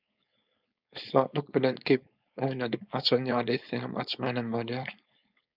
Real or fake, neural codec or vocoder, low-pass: fake; codec, 16 kHz, 4.8 kbps, FACodec; 5.4 kHz